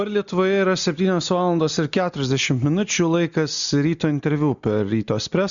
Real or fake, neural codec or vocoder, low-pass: real; none; 7.2 kHz